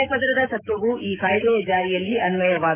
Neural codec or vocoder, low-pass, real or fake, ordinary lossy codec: autoencoder, 48 kHz, 128 numbers a frame, DAC-VAE, trained on Japanese speech; 3.6 kHz; fake; MP3, 32 kbps